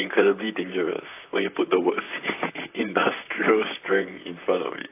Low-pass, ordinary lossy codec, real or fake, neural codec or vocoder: 3.6 kHz; AAC, 24 kbps; fake; vocoder, 44.1 kHz, 128 mel bands, Pupu-Vocoder